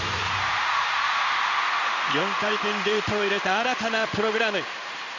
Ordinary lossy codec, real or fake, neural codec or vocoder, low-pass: none; fake; codec, 16 kHz in and 24 kHz out, 1 kbps, XY-Tokenizer; 7.2 kHz